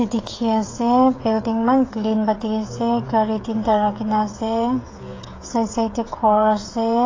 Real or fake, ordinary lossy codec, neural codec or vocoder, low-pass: fake; AAC, 32 kbps; codec, 16 kHz, 16 kbps, FreqCodec, smaller model; 7.2 kHz